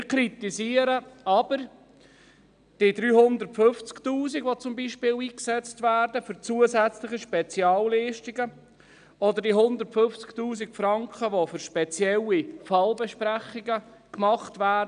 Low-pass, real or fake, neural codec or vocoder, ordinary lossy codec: 9.9 kHz; real; none; none